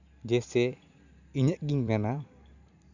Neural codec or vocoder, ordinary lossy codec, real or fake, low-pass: vocoder, 44.1 kHz, 128 mel bands every 256 samples, BigVGAN v2; none; fake; 7.2 kHz